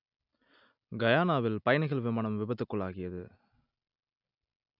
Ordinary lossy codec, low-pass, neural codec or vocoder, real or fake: none; 5.4 kHz; none; real